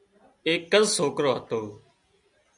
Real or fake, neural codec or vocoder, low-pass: real; none; 10.8 kHz